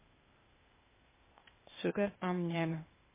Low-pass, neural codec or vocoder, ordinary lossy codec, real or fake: 3.6 kHz; codec, 16 kHz, 0.8 kbps, ZipCodec; MP3, 16 kbps; fake